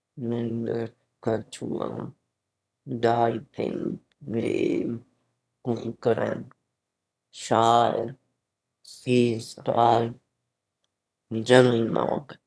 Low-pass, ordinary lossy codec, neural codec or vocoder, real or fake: none; none; autoencoder, 22.05 kHz, a latent of 192 numbers a frame, VITS, trained on one speaker; fake